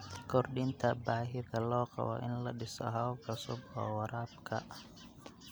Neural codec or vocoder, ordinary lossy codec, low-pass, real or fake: none; none; none; real